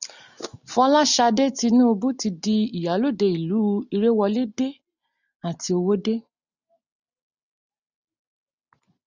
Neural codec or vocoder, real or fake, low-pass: none; real; 7.2 kHz